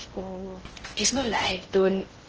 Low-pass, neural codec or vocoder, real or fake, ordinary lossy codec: 7.2 kHz; codec, 16 kHz in and 24 kHz out, 0.6 kbps, FocalCodec, streaming, 4096 codes; fake; Opus, 16 kbps